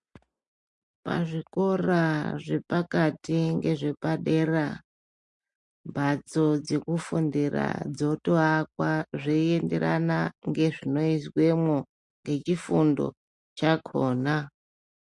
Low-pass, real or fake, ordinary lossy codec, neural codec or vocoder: 10.8 kHz; real; MP3, 48 kbps; none